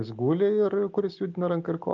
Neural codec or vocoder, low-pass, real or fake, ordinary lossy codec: none; 7.2 kHz; real; Opus, 24 kbps